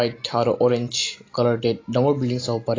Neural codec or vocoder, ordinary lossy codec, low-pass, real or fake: none; AAC, 32 kbps; 7.2 kHz; real